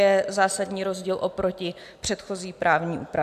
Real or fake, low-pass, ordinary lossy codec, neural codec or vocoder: real; 14.4 kHz; AAC, 96 kbps; none